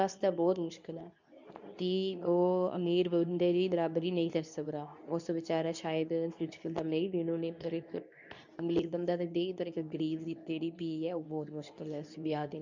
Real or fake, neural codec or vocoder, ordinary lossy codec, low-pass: fake; codec, 24 kHz, 0.9 kbps, WavTokenizer, medium speech release version 2; none; 7.2 kHz